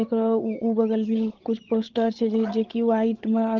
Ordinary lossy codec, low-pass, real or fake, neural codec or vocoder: Opus, 16 kbps; 7.2 kHz; fake; codec, 16 kHz, 16 kbps, FreqCodec, larger model